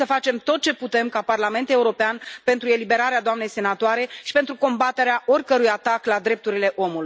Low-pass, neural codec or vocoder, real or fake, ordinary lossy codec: none; none; real; none